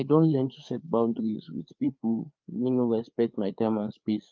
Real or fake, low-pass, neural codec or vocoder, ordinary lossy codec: fake; 7.2 kHz; codec, 16 kHz, 4 kbps, FreqCodec, larger model; Opus, 32 kbps